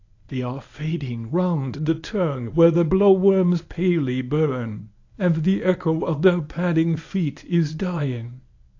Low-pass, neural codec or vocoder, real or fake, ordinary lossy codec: 7.2 kHz; codec, 24 kHz, 0.9 kbps, WavTokenizer, medium speech release version 1; fake; AAC, 48 kbps